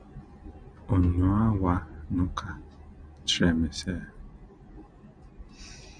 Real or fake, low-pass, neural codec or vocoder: real; 9.9 kHz; none